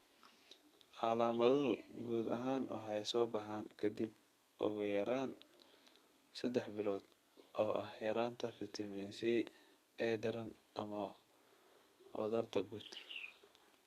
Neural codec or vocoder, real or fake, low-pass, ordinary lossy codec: codec, 32 kHz, 1.9 kbps, SNAC; fake; 14.4 kHz; Opus, 64 kbps